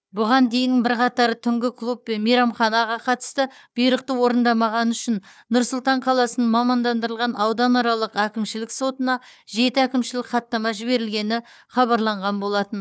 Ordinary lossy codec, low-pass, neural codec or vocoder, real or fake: none; none; codec, 16 kHz, 4 kbps, FunCodec, trained on Chinese and English, 50 frames a second; fake